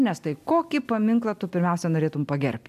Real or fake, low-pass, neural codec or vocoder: real; 14.4 kHz; none